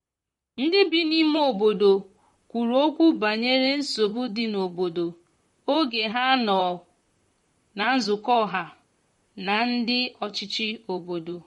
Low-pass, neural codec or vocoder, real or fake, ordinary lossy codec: 19.8 kHz; vocoder, 44.1 kHz, 128 mel bands, Pupu-Vocoder; fake; MP3, 48 kbps